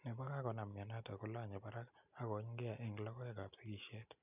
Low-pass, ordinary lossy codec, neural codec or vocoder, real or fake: 3.6 kHz; none; none; real